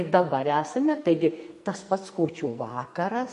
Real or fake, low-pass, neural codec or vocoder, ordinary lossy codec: fake; 14.4 kHz; codec, 44.1 kHz, 2.6 kbps, SNAC; MP3, 48 kbps